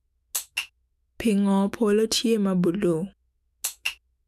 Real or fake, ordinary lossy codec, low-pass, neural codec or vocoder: fake; none; 14.4 kHz; autoencoder, 48 kHz, 128 numbers a frame, DAC-VAE, trained on Japanese speech